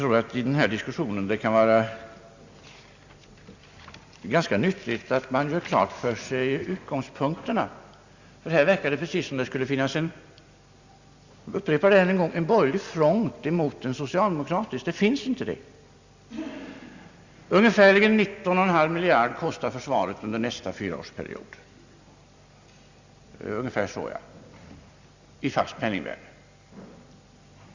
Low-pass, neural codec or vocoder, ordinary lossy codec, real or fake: 7.2 kHz; none; Opus, 64 kbps; real